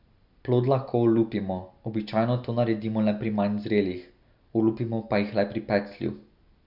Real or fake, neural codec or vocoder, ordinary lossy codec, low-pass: real; none; none; 5.4 kHz